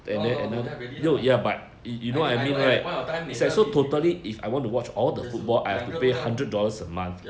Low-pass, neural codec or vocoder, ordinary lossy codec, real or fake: none; none; none; real